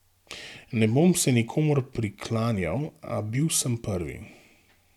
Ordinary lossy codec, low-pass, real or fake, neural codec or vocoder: none; 19.8 kHz; real; none